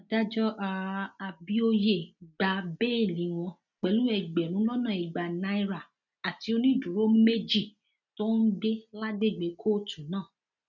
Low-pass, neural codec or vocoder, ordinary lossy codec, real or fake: 7.2 kHz; none; none; real